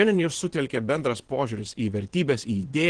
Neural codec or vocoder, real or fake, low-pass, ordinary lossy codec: vocoder, 22.05 kHz, 80 mel bands, WaveNeXt; fake; 9.9 kHz; Opus, 16 kbps